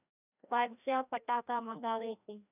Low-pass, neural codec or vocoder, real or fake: 3.6 kHz; codec, 16 kHz, 1 kbps, FreqCodec, larger model; fake